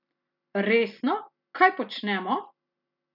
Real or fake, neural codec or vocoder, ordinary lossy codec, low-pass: real; none; none; 5.4 kHz